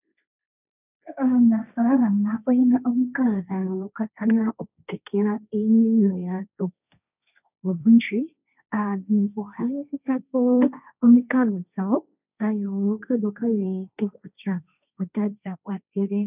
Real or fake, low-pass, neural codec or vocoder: fake; 3.6 kHz; codec, 16 kHz, 1.1 kbps, Voila-Tokenizer